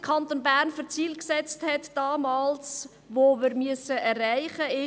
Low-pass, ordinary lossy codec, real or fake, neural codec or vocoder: none; none; real; none